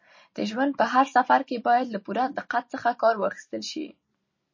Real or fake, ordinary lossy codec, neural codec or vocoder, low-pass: real; MP3, 32 kbps; none; 7.2 kHz